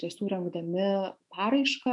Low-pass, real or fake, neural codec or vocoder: 10.8 kHz; fake; autoencoder, 48 kHz, 128 numbers a frame, DAC-VAE, trained on Japanese speech